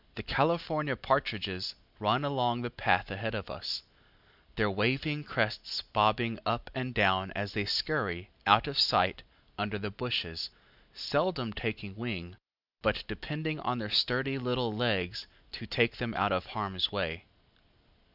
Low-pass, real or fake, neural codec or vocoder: 5.4 kHz; real; none